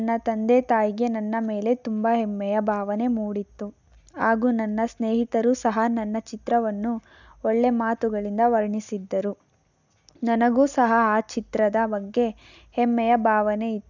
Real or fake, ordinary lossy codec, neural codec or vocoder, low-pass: real; none; none; 7.2 kHz